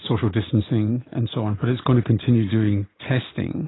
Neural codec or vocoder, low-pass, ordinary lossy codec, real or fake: none; 7.2 kHz; AAC, 16 kbps; real